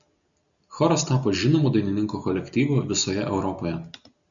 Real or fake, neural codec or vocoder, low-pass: real; none; 7.2 kHz